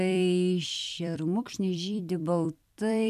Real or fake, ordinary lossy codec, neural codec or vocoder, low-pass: fake; MP3, 96 kbps; vocoder, 44.1 kHz, 128 mel bands every 256 samples, BigVGAN v2; 14.4 kHz